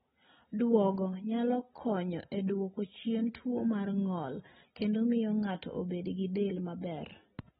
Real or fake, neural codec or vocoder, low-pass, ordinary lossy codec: real; none; 10.8 kHz; AAC, 16 kbps